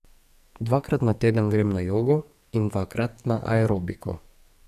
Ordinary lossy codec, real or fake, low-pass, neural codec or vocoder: none; fake; 14.4 kHz; codec, 44.1 kHz, 2.6 kbps, SNAC